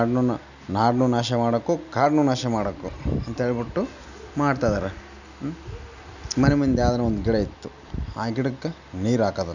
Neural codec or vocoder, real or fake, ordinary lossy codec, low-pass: none; real; none; 7.2 kHz